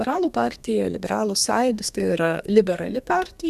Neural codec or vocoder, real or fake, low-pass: codec, 32 kHz, 1.9 kbps, SNAC; fake; 14.4 kHz